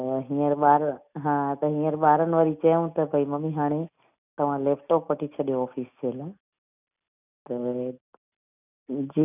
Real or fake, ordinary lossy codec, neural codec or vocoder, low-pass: real; none; none; 3.6 kHz